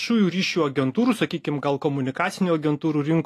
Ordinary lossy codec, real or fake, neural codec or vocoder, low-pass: AAC, 48 kbps; real; none; 14.4 kHz